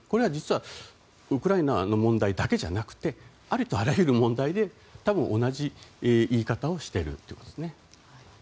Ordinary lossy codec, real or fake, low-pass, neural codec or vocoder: none; real; none; none